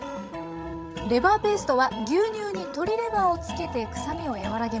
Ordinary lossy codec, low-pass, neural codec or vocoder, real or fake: none; none; codec, 16 kHz, 16 kbps, FreqCodec, larger model; fake